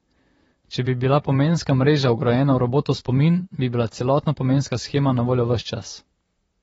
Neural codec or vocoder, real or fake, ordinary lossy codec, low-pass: vocoder, 44.1 kHz, 128 mel bands, Pupu-Vocoder; fake; AAC, 24 kbps; 19.8 kHz